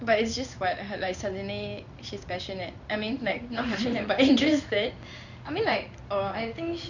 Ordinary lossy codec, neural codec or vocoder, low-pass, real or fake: none; codec, 16 kHz in and 24 kHz out, 1 kbps, XY-Tokenizer; 7.2 kHz; fake